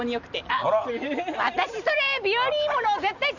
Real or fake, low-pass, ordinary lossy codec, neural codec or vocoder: real; 7.2 kHz; none; none